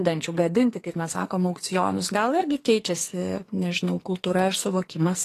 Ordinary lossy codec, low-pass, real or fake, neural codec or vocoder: AAC, 48 kbps; 14.4 kHz; fake; codec, 44.1 kHz, 2.6 kbps, SNAC